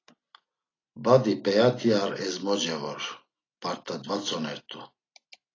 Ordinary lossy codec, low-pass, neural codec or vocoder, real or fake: AAC, 32 kbps; 7.2 kHz; none; real